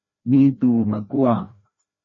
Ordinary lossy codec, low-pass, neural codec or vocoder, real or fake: MP3, 32 kbps; 7.2 kHz; codec, 16 kHz, 1 kbps, FreqCodec, larger model; fake